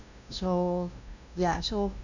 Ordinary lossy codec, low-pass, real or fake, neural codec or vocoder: none; 7.2 kHz; fake; codec, 16 kHz, 0.5 kbps, FunCodec, trained on LibriTTS, 25 frames a second